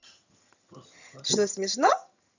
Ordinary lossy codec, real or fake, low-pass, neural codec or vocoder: none; fake; 7.2 kHz; vocoder, 22.05 kHz, 80 mel bands, HiFi-GAN